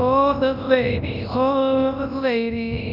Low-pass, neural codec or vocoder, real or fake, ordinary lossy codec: 5.4 kHz; codec, 24 kHz, 0.9 kbps, WavTokenizer, large speech release; fake; AAC, 48 kbps